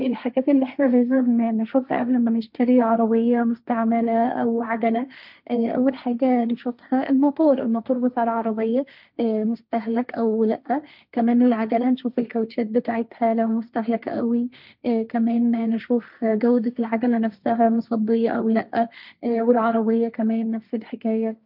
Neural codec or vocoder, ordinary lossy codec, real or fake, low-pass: codec, 16 kHz, 1.1 kbps, Voila-Tokenizer; none; fake; 5.4 kHz